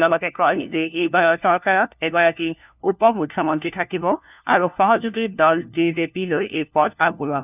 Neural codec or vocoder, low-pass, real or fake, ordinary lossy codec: codec, 16 kHz, 1 kbps, FunCodec, trained on LibriTTS, 50 frames a second; 3.6 kHz; fake; none